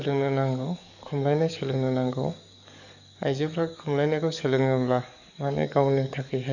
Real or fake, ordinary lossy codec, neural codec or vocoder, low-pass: fake; none; codec, 44.1 kHz, 7.8 kbps, DAC; 7.2 kHz